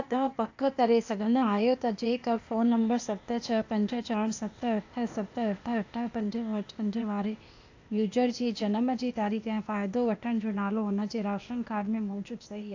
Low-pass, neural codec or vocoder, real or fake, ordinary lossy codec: 7.2 kHz; codec, 16 kHz, 0.8 kbps, ZipCodec; fake; AAC, 48 kbps